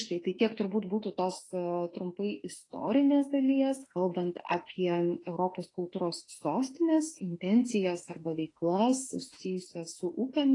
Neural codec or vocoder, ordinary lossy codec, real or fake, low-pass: autoencoder, 48 kHz, 32 numbers a frame, DAC-VAE, trained on Japanese speech; AAC, 32 kbps; fake; 10.8 kHz